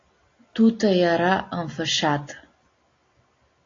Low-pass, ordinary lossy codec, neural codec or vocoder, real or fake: 7.2 kHz; AAC, 32 kbps; none; real